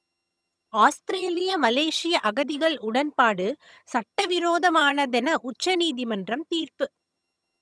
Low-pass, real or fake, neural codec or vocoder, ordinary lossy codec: none; fake; vocoder, 22.05 kHz, 80 mel bands, HiFi-GAN; none